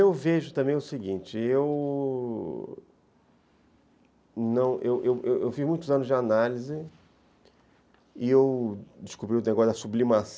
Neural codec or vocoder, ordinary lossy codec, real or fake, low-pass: none; none; real; none